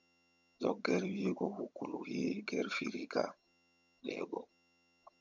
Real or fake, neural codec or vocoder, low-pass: fake; vocoder, 22.05 kHz, 80 mel bands, HiFi-GAN; 7.2 kHz